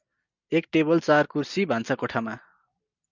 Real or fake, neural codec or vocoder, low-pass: real; none; 7.2 kHz